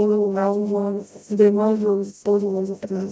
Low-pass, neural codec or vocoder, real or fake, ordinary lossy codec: none; codec, 16 kHz, 0.5 kbps, FreqCodec, smaller model; fake; none